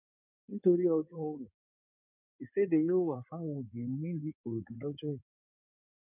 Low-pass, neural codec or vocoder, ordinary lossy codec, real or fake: 3.6 kHz; codec, 16 kHz in and 24 kHz out, 2.2 kbps, FireRedTTS-2 codec; none; fake